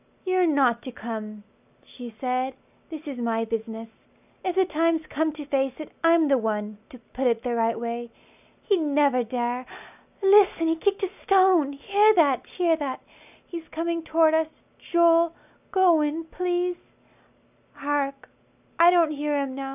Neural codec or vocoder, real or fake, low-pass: none; real; 3.6 kHz